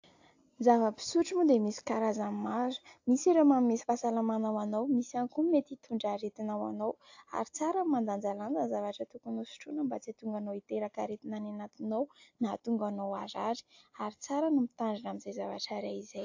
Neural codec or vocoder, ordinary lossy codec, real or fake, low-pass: none; AAC, 48 kbps; real; 7.2 kHz